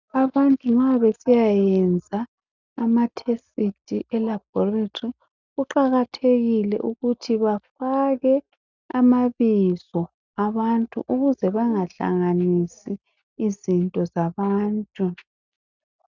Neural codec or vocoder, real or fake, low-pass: none; real; 7.2 kHz